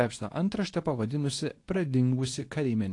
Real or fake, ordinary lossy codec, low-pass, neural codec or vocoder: fake; AAC, 48 kbps; 10.8 kHz; codec, 24 kHz, 0.9 kbps, WavTokenizer, medium speech release version 2